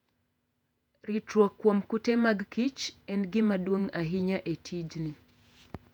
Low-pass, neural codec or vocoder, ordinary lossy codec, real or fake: 19.8 kHz; vocoder, 48 kHz, 128 mel bands, Vocos; none; fake